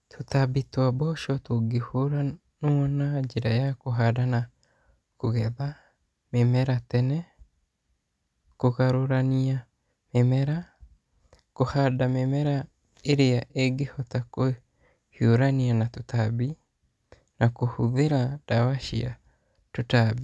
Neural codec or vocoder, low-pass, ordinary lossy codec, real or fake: none; none; none; real